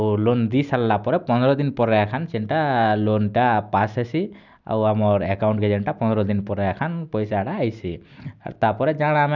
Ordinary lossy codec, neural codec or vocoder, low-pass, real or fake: none; none; 7.2 kHz; real